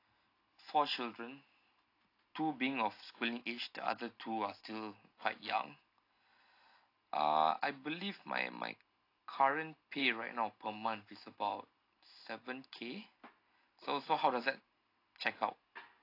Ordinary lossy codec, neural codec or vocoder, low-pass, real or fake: AAC, 32 kbps; none; 5.4 kHz; real